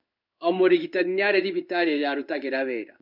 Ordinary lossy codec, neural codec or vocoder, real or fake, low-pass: none; codec, 16 kHz in and 24 kHz out, 1 kbps, XY-Tokenizer; fake; 5.4 kHz